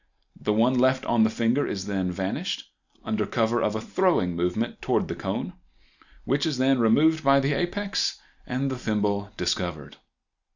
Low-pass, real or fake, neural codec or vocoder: 7.2 kHz; real; none